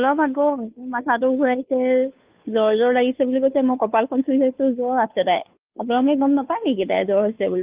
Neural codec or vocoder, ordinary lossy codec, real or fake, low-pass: codec, 16 kHz, 2 kbps, FunCodec, trained on Chinese and English, 25 frames a second; Opus, 24 kbps; fake; 3.6 kHz